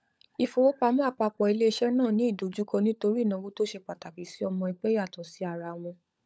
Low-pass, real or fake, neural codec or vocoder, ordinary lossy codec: none; fake; codec, 16 kHz, 16 kbps, FunCodec, trained on LibriTTS, 50 frames a second; none